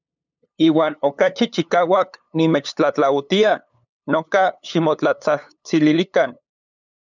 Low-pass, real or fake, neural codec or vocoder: 7.2 kHz; fake; codec, 16 kHz, 8 kbps, FunCodec, trained on LibriTTS, 25 frames a second